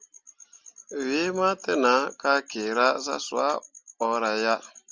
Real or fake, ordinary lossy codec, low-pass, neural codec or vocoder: real; Opus, 32 kbps; 7.2 kHz; none